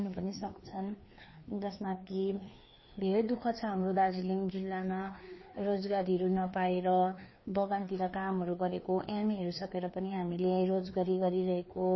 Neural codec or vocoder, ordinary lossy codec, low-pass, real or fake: codec, 16 kHz, 2 kbps, FreqCodec, larger model; MP3, 24 kbps; 7.2 kHz; fake